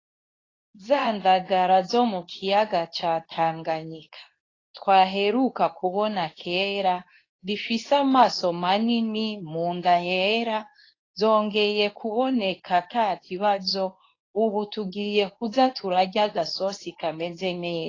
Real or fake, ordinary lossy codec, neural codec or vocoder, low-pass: fake; AAC, 32 kbps; codec, 24 kHz, 0.9 kbps, WavTokenizer, medium speech release version 2; 7.2 kHz